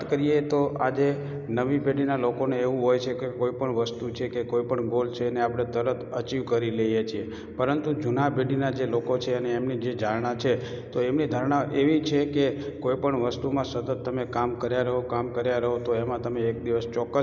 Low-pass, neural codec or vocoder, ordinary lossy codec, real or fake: 7.2 kHz; none; none; real